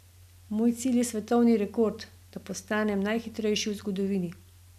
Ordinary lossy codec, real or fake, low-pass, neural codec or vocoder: none; real; 14.4 kHz; none